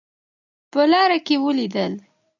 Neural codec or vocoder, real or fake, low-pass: none; real; 7.2 kHz